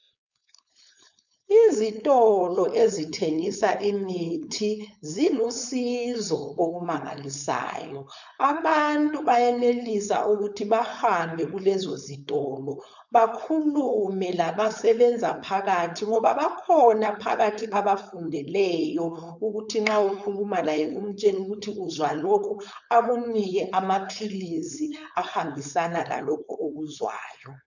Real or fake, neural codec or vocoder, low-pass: fake; codec, 16 kHz, 4.8 kbps, FACodec; 7.2 kHz